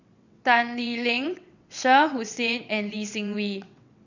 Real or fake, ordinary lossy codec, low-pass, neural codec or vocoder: fake; none; 7.2 kHz; vocoder, 22.05 kHz, 80 mel bands, WaveNeXt